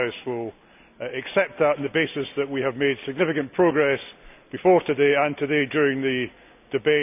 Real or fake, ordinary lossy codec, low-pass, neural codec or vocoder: real; none; 3.6 kHz; none